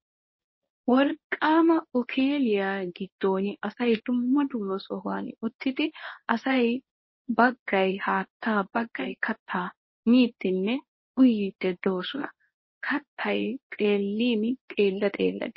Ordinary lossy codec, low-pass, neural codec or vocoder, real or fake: MP3, 24 kbps; 7.2 kHz; codec, 24 kHz, 0.9 kbps, WavTokenizer, medium speech release version 1; fake